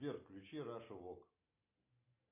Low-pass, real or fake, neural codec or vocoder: 3.6 kHz; real; none